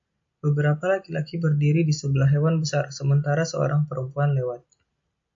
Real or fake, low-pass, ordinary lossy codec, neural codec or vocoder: real; 7.2 kHz; MP3, 48 kbps; none